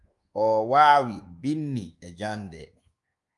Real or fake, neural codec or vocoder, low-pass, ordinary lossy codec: fake; codec, 24 kHz, 1.2 kbps, DualCodec; 10.8 kHz; Opus, 32 kbps